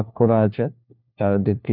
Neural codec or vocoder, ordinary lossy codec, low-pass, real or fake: codec, 16 kHz, 1 kbps, FunCodec, trained on LibriTTS, 50 frames a second; none; 5.4 kHz; fake